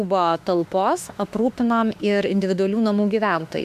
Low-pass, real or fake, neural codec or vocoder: 14.4 kHz; fake; autoencoder, 48 kHz, 32 numbers a frame, DAC-VAE, trained on Japanese speech